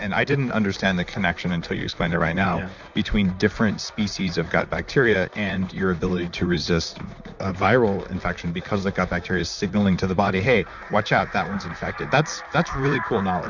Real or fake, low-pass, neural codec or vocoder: fake; 7.2 kHz; vocoder, 44.1 kHz, 128 mel bands, Pupu-Vocoder